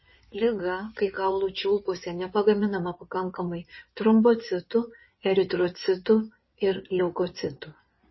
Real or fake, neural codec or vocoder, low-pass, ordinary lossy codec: fake; codec, 16 kHz in and 24 kHz out, 2.2 kbps, FireRedTTS-2 codec; 7.2 kHz; MP3, 24 kbps